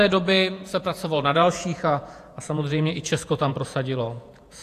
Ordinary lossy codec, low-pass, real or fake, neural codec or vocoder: AAC, 64 kbps; 14.4 kHz; fake; vocoder, 48 kHz, 128 mel bands, Vocos